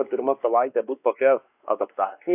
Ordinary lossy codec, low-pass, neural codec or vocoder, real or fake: AAC, 32 kbps; 3.6 kHz; codec, 16 kHz, 2 kbps, X-Codec, WavLM features, trained on Multilingual LibriSpeech; fake